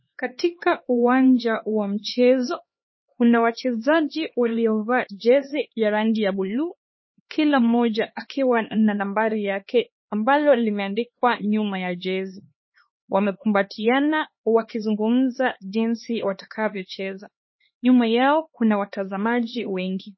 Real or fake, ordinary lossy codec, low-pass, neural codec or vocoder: fake; MP3, 24 kbps; 7.2 kHz; codec, 16 kHz, 2 kbps, X-Codec, HuBERT features, trained on LibriSpeech